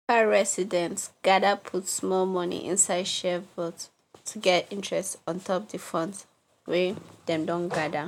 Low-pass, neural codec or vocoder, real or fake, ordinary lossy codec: 19.8 kHz; none; real; MP3, 96 kbps